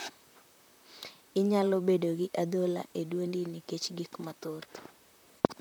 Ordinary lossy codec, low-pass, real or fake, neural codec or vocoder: none; none; fake; vocoder, 44.1 kHz, 128 mel bands, Pupu-Vocoder